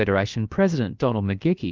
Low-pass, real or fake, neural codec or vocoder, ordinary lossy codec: 7.2 kHz; fake; codec, 16 kHz, about 1 kbps, DyCAST, with the encoder's durations; Opus, 32 kbps